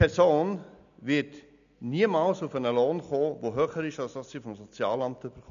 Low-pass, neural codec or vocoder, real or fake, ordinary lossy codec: 7.2 kHz; none; real; MP3, 96 kbps